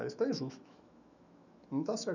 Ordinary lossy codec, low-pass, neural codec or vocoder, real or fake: none; 7.2 kHz; autoencoder, 48 kHz, 128 numbers a frame, DAC-VAE, trained on Japanese speech; fake